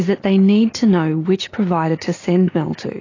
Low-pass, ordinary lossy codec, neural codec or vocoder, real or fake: 7.2 kHz; AAC, 32 kbps; none; real